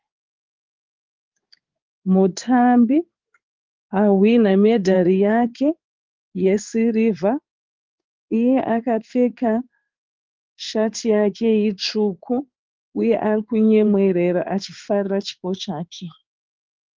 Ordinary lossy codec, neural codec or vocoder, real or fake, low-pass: Opus, 24 kbps; codec, 16 kHz in and 24 kHz out, 1 kbps, XY-Tokenizer; fake; 7.2 kHz